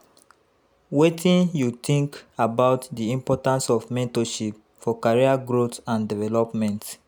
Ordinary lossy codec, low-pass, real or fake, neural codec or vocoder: none; none; real; none